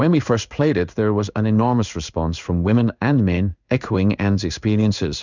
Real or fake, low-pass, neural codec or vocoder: fake; 7.2 kHz; codec, 16 kHz in and 24 kHz out, 1 kbps, XY-Tokenizer